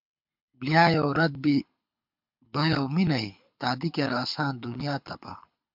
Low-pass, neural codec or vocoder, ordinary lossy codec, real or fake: 5.4 kHz; codec, 24 kHz, 6 kbps, HILCodec; MP3, 48 kbps; fake